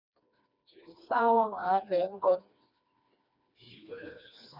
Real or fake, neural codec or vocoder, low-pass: fake; codec, 16 kHz, 2 kbps, FreqCodec, smaller model; 5.4 kHz